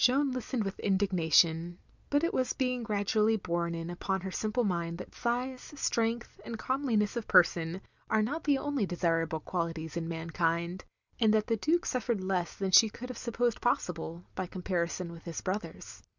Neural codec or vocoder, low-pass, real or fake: none; 7.2 kHz; real